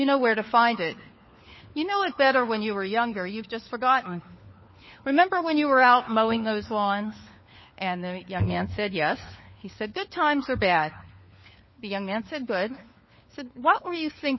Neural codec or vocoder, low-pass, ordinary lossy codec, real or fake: codec, 16 kHz, 4 kbps, FunCodec, trained on LibriTTS, 50 frames a second; 7.2 kHz; MP3, 24 kbps; fake